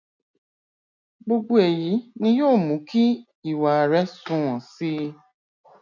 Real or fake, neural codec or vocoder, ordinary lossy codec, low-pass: real; none; none; 7.2 kHz